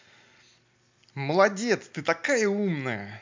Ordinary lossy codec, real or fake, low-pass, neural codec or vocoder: MP3, 64 kbps; real; 7.2 kHz; none